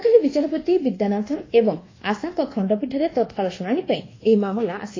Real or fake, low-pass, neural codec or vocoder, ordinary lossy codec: fake; 7.2 kHz; codec, 24 kHz, 1.2 kbps, DualCodec; AAC, 32 kbps